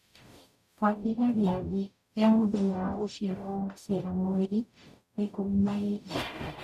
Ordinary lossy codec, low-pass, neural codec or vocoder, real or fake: none; 14.4 kHz; codec, 44.1 kHz, 0.9 kbps, DAC; fake